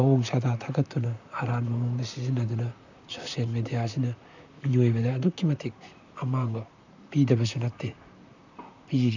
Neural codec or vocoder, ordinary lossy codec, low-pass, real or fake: vocoder, 44.1 kHz, 128 mel bands, Pupu-Vocoder; none; 7.2 kHz; fake